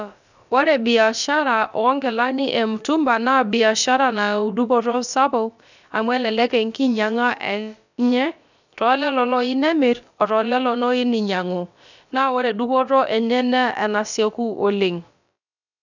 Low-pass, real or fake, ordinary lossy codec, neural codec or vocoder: 7.2 kHz; fake; none; codec, 16 kHz, about 1 kbps, DyCAST, with the encoder's durations